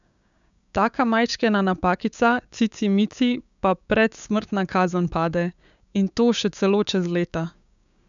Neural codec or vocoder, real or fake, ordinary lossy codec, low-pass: codec, 16 kHz, 6 kbps, DAC; fake; none; 7.2 kHz